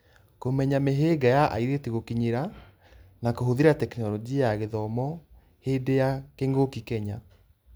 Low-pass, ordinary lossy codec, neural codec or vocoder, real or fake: none; none; none; real